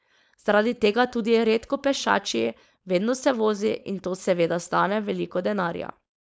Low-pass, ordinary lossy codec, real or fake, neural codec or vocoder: none; none; fake; codec, 16 kHz, 4.8 kbps, FACodec